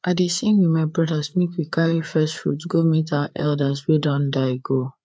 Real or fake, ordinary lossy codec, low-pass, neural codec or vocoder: fake; none; none; codec, 16 kHz, 4 kbps, FreqCodec, larger model